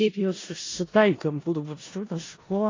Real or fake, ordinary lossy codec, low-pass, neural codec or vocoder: fake; AAC, 32 kbps; 7.2 kHz; codec, 16 kHz in and 24 kHz out, 0.4 kbps, LongCat-Audio-Codec, four codebook decoder